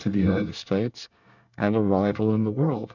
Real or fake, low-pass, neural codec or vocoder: fake; 7.2 kHz; codec, 24 kHz, 1 kbps, SNAC